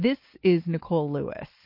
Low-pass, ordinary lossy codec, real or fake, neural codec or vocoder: 5.4 kHz; MP3, 32 kbps; real; none